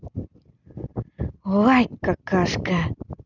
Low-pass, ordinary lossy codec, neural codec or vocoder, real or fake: 7.2 kHz; none; none; real